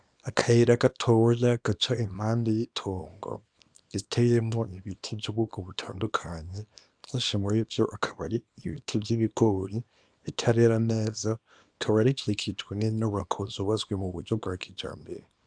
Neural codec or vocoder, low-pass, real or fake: codec, 24 kHz, 0.9 kbps, WavTokenizer, small release; 9.9 kHz; fake